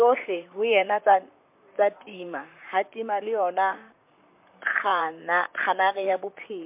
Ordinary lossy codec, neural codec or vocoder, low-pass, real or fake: MP3, 32 kbps; vocoder, 44.1 kHz, 128 mel bands, Pupu-Vocoder; 3.6 kHz; fake